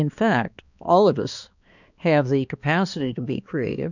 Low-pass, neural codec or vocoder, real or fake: 7.2 kHz; codec, 16 kHz, 2 kbps, X-Codec, HuBERT features, trained on balanced general audio; fake